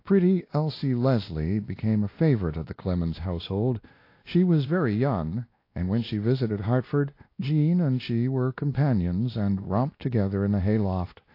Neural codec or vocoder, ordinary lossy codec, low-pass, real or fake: codec, 16 kHz, 1 kbps, X-Codec, WavLM features, trained on Multilingual LibriSpeech; AAC, 32 kbps; 5.4 kHz; fake